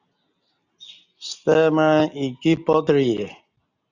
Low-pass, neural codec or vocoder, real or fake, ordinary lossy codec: 7.2 kHz; none; real; Opus, 64 kbps